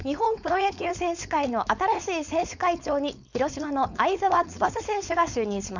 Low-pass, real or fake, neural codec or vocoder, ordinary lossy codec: 7.2 kHz; fake; codec, 16 kHz, 4.8 kbps, FACodec; none